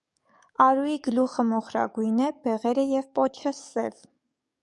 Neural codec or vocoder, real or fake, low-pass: codec, 44.1 kHz, 7.8 kbps, DAC; fake; 10.8 kHz